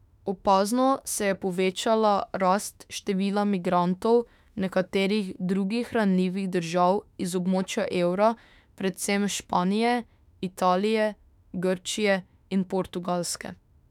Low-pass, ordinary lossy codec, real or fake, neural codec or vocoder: 19.8 kHz; none; fake; autoencoder, 48 kHz, 32 numbers a frame, DAC-VAE, trained on Japanese speech